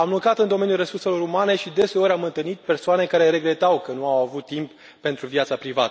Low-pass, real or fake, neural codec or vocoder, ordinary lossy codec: none; real; none; none